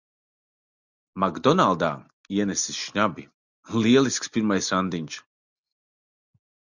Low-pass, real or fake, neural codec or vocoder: 7.2 kHz; real; none